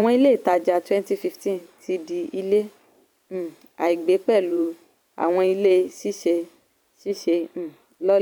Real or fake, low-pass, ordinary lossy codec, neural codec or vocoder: fake; 19.8 kHz; none; vocoder, 44.1 kHz, 128 mel bands every 512 samples, BigVGAN v2